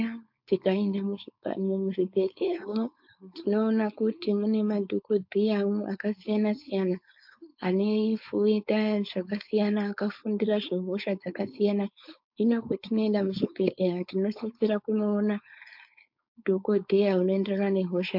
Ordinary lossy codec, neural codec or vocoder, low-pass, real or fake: MP3, 48 kbps; codec, 16 kHz, 4.8 kbps, FACodec; 5.4 kHz; fake